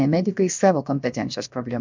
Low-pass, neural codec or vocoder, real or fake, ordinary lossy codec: 7.2 kHz; codec, 16 kHz, about 1 kbps, DyCAST, with the encoder's durations; fake; MP3, 64 kbps